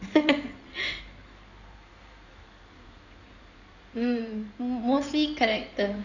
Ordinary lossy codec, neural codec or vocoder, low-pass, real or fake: none; codec, 16 kHz in and 24 kHz out, 2.2 kbps, FireRedTTS-2 codec; 7.2 kHz; fake